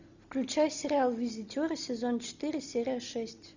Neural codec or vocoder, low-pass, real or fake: none; 7.2 kHz; real